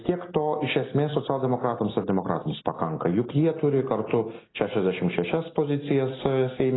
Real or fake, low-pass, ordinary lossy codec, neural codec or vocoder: real; 7.2 kHz; AAC, 16 kbps; none